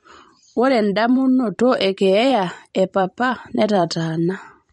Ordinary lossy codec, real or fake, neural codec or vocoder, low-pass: MP3, 48 kbps; fake; vocoder, 44.1 kHz, 128 mel bands every 512 samples, BigVGAN v2; 19.8 kHz